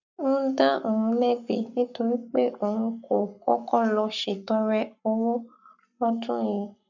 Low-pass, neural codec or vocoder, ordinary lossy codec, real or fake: 7.2 kHz; codec, 44.1 kHz, 7.8 kbps, Pupu-Codec; none; fake